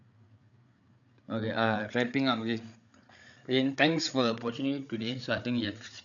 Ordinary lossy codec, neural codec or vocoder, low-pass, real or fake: none; codec, 16 kHz, 8 kbps, FreqCodec, larger model; 7.2 kHz; fake